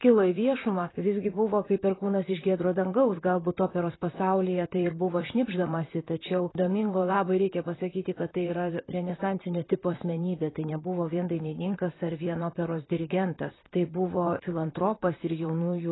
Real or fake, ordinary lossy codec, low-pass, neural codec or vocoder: fake; AAC, 16 kbps; 7.2 kHz; vocoder, 44.1 kHz, 128 mel bands, Pupu-Vocoder